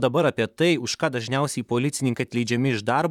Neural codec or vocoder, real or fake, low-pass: vocoder, 44.1 kHz, 128 mel bands, Pupu-Vocoder; fake; 19.8 kHz